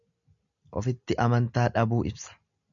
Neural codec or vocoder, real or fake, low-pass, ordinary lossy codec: none; real; 7.2 kHz; MP3, 96 kbps